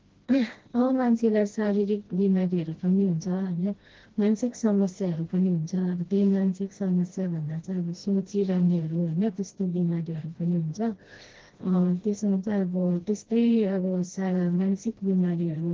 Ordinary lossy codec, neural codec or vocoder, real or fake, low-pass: Opus, 16 kbps; codec, 16 kHz, 1 kbps, FreqCodec, smaller model; fake; 7.2 kHz